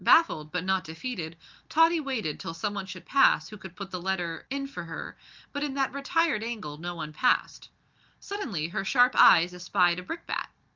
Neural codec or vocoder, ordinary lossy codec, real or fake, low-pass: none; Opus, 24 kbps; real; 7.2 kHz